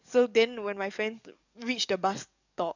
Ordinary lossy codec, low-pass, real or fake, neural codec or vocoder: none; 7.2 kHz; fake; codec, 44.1 kHz, 7.8 kbps, DAC